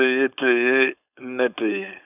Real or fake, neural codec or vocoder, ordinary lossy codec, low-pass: fake; codec, 16 kHz, 4.8 kbps, FACodec; none; 3.6 kHz